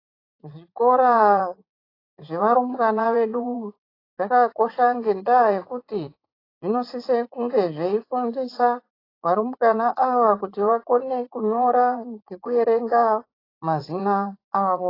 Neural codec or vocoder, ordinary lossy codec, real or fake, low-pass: vocoder, 44.1 kHz, 80 mel bands, Vocos; AAC, 24 kbps; fake; 5.4 kHz